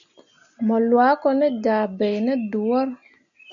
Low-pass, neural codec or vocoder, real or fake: 7.2 kHz; none; real